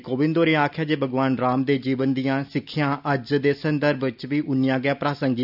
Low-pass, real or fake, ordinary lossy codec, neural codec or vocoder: 5.4 kHz; real; none; none